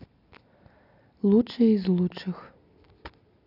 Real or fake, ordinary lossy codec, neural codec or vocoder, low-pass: real; none; none; 5.4 kHz